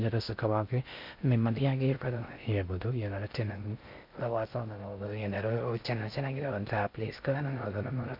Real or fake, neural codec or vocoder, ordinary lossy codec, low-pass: fake; codec, 16 kHz in and 24 kHz out, 0.6 kbps, FocalCodec, streaming, 2048 codes; MP3, 48 kbps; 5.4 kHz